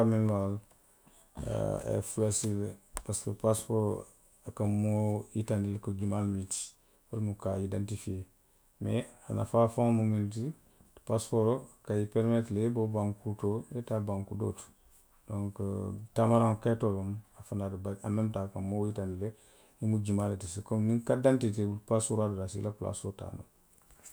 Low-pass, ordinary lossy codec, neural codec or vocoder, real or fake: none; none; autoencoder, 48 kHz, 128 numbers a frame, DAC-VAE, trained on Japanese speech; fake